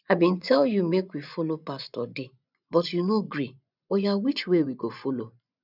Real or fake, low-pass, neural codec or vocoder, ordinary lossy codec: fake; 5.4 kHz; vocoder, 24 kHz, 100 mel bands, Vocos; none